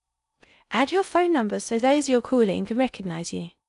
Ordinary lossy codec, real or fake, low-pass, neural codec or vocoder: none; fake; 10.8 kHz; codec, 16 kHz in and 24 kHz out, 0.6 kbps, FocalCodec, streaming, 4096 codes